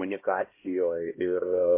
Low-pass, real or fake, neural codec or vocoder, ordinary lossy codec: 3.6 kHz; fake; codec, 16 kHz, 1 kbps, X-Codec, HuBERT features, trained on LibriSpeech; MP3, 24 kbps